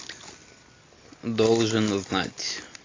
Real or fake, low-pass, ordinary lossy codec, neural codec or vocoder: real; 7.2 kHz; AAC, 32 kbps; none